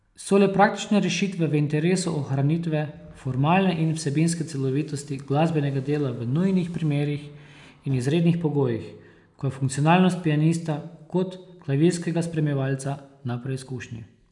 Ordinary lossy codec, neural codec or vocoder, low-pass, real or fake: none; none; 10.8 kHz; real